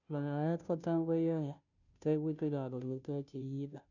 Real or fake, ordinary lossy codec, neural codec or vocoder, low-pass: fake; none; codec, 16 kHz, 0.5 kbps, FunCodec, trained on Chinese and English, 25 frames a second; 7.2 kHz